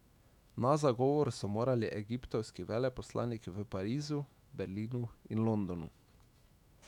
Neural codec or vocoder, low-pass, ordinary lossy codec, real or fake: autoencoder, 48 kHz, 128 numbers a frame, DAC-VAE, trained on Japanese speech; 19.8 kHz; none; fake